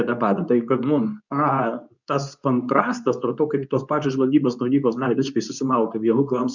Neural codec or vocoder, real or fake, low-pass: codec, 24 kHz, 0.9 kbps, WavTokenizer, medium speech release version 2; fake; 7.2 kHz